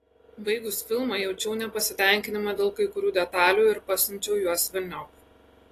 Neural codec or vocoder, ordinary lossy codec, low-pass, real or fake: none; AAC, 48 kbps; 14.4 kHz; real